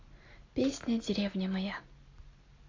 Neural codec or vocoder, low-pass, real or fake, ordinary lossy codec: none; 7.2 kHz; real; AAC, 32 kbps